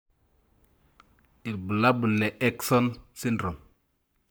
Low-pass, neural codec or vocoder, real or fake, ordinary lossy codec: none; codec, 44.1 kHz, 7.8 kbps, Pupu-Codec; fake; none